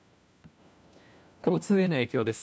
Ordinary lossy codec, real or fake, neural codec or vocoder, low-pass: none; fake; codec, 16 kHz, 1 kbps, FunCodec, trained on LibriTTS, 50 frames a second; none